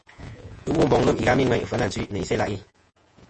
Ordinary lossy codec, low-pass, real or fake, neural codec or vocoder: MP3, 32 kbps; 10.8 kHz; fake; vocoder, 48 kHz, 128 mel bands, Vocos